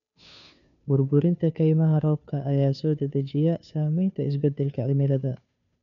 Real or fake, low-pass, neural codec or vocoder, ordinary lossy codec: fake; 7.2 kHz; codec, 16 kHz, 2 kbps, FunCodec, trained on Chinese and English, 25 frames a second; none